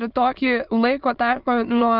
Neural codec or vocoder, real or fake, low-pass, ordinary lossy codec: autoencoder, 22.05 kHz, a latent of 192 numbers a frame, VITS, trained on many speakers; fake; 5.4 kHz; Opus, 24 kbps